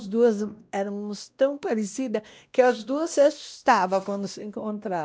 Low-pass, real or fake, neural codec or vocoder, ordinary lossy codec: none; fake; codec, 16 kHz, 1 kbps, X-Codec, WavLM features, trained on Multilingual LibriSpeech; none